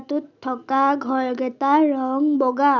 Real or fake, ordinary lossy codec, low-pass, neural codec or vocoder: real; none; 7.2 kHz; none